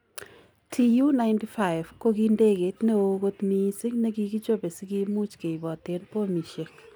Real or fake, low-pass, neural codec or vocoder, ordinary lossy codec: real; none; none; none